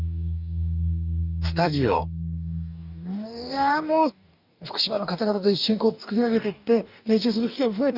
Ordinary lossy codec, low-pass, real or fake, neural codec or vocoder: none; 5.4 kHz; fake; codec, 44.1 kHz, 2.6 kbps, DAC